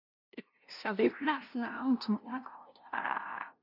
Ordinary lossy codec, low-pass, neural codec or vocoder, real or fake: none; 5.4 kHz; codec, 16 kHz, 0.5 kbps, FunCodec, trained on LibriTTS, 25 frames a second; fake